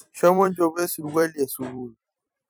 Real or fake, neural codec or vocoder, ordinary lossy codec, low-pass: fake; vocoder, 44.1 kHz, 128 mel bands every 256 samples, BigVGAN v2; none; none